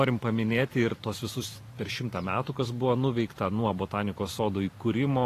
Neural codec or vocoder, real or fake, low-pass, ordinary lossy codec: vocoder, 44.1 kHz, 128 mel bands every 512 samples, BigVGAN v2; fake; 14.4 kHz; AAC, 48 kbps